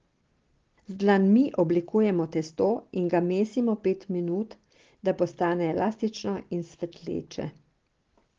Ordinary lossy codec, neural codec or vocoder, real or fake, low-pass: Opus, 16 kbps; none; real; 7.2 kHz